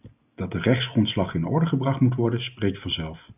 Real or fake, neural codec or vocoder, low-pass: real; none; 3.6 kHz